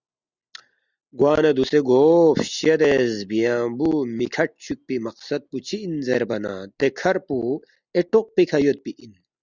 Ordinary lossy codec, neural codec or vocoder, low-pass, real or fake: Opus, 64 kbps; none; 7.2 kHz; real